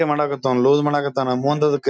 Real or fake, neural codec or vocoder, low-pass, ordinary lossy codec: real; none; none; none